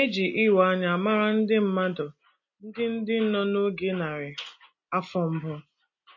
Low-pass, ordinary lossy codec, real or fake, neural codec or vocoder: 7.2 kHz; MP3, 32 kbps; real; none